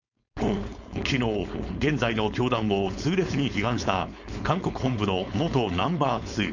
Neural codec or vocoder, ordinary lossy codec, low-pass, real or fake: codec, 16 kHz, 4.8 kbps, FACodec; none; 7.2 kHz; fake